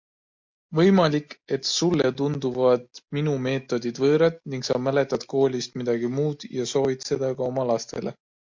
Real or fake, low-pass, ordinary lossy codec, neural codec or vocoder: real; 7.2 kHz; MP3, 48 kbps; none